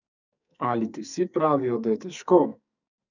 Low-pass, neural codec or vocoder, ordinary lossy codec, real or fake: 7.2 kHz; codec, 44.1 kHz, 2.6 kbps, SNAC; none; fake